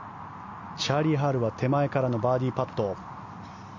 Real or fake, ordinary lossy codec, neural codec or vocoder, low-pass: real; none; none; 7.2 kHz